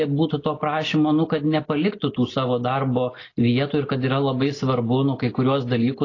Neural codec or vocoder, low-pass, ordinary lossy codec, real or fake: none; 7.2 kHz; AAC, 32 kbps; real